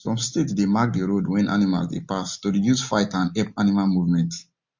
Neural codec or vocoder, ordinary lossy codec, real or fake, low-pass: none; MP3, 48 kbps; real; 7.2 kHz